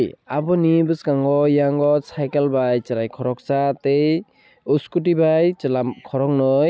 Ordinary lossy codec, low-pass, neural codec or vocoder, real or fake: none; none; none; real